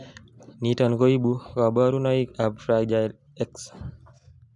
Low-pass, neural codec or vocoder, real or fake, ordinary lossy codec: 10.8 kHz; none; real; none